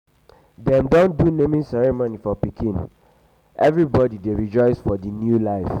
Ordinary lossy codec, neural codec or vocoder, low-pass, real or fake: none; none; 19.8 kHz; real